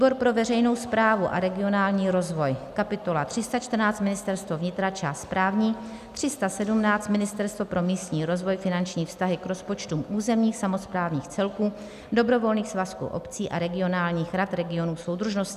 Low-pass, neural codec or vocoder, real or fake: 14.4 kHz; none; real